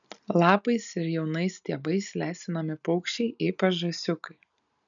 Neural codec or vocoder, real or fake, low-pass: none; real; 7.2 kHz